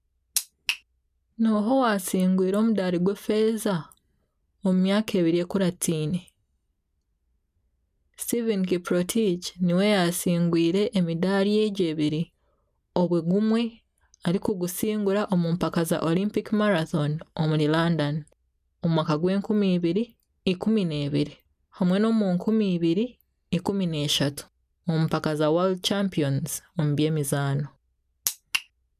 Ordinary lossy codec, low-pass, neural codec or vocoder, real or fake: none; 14.4 kHz; none; real